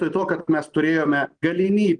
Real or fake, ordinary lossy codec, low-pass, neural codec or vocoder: real; Opus, 24 kbps; 9.9 kHz; none